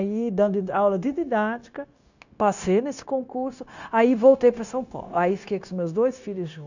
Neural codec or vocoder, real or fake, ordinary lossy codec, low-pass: codec, 16 kHz, 0.9 kbps, LongCat-Audio-Codec; fake; none; 7.2 kHz